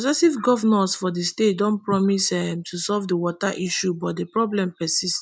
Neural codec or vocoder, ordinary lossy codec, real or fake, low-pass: none; none; real; none